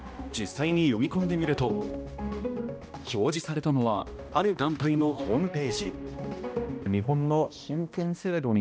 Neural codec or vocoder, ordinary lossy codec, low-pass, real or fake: codec, 16 kHz, 1 kbps, X-Codec, HuBERT features, trained on balanced general audio; none; none; fake